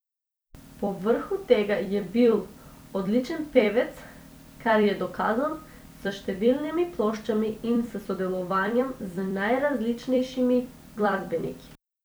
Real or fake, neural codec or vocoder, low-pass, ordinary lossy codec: fake; vocoder, 44.1 kHz, 128 mel bands every 256 samples, BigVGAN v2; none; none